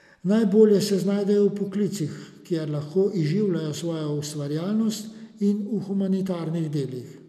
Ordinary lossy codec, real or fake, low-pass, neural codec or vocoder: none; real; 14.4 kHz; none